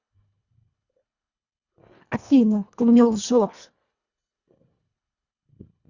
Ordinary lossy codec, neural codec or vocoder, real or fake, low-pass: Opus, 64 kbps; codec, 24 kHz, 1.5 kbps, HILCodec; fake; 7.2 kHz